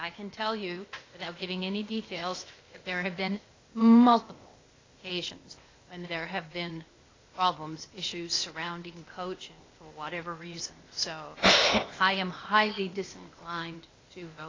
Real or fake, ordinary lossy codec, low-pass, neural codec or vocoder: fake; AAC, 32 kbps; 7.2 kHz; codec, 16 kHz, 0.8 kbps, ZipCodec